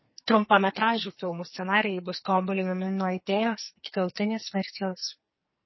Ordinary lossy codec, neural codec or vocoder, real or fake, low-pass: MP3, 24 kbps; codec, 44.1 kHz, 2.6 kbps, SNAC; fake; 7.2 kHz